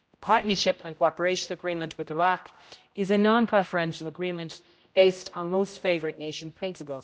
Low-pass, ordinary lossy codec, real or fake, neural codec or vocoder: none; none; fake; codec, 16 kHz, 0.5 kbps, X-Codec, HuBERT features, trained on general audio